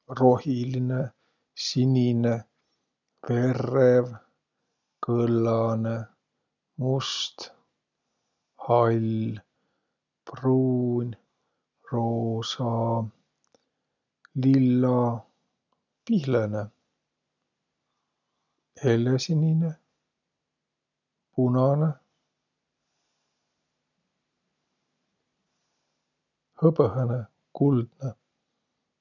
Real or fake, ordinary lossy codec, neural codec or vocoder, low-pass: real; none; none; 7.2 kHz